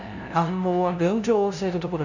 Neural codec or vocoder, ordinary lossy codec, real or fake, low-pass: codec, 16 kHz, 0.5 kbps, FunCodec, trained on LibriTTS, 25 frames a second; none; fake; 7.2 kHz